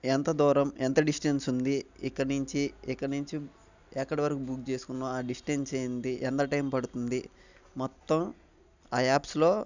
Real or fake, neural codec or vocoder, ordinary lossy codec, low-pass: real; none; none; 7.2 kHz